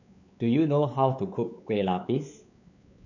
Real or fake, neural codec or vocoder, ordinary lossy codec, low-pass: fake; codec, 16 kHz, 4 kbps, X-Codec, WavLM features, trained on Multilingual LibriSpeech; none; 7.2 kHz